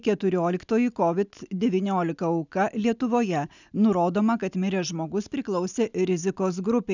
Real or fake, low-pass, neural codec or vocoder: real; 7.2 kHz; none